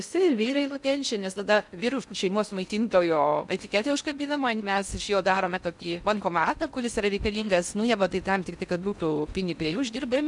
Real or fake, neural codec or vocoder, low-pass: fake; codec, 16 kHz in and 24 kHz out, 0.6 kbps, FocalCodec, streaming, 2048 codes; 10.8 kHz